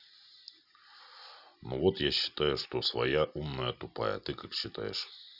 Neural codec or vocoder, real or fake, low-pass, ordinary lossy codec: none; real; 5.4 kHz; none